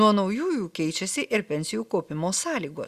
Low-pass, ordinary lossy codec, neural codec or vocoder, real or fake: 14.4 kHz; Opus, 64 kbps; none; real